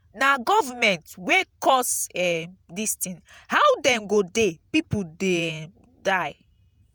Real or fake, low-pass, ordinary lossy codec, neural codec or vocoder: fake; none; none; vocoder, 48 kHz, 128 mel bands, Vocos